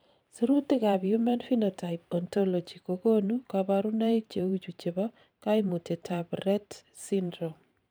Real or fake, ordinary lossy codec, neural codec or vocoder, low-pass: fake; none; vocoder, 44.1 kHz, 128 mel bands every 512 samples, BigVGAN v2; none